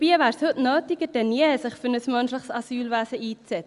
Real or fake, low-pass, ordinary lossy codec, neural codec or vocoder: real; 10.8 kHz; Opus, 64 kbps; none